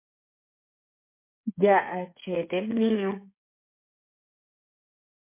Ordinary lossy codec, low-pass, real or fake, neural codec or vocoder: MP3, 24 kbps; 3.6 kHz; fake; codec, 16 kHz, 8 kbps, FreqCodec, smaller model